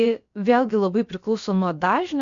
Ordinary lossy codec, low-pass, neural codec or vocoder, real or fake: MP3, 48 kbps; 7.2 kHz; codec, 16 kHz, about 1 kbps, DyCAST, with the encoder's durations; fake